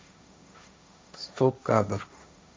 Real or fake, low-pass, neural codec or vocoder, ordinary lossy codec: fake; none; codec, 16 kHz, 1.1 kbps, Voila-Tokenizer; none